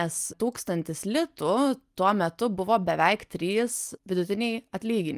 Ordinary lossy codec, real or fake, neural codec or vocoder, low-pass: Opus, 32 kbps; real; none; 14.4 kHz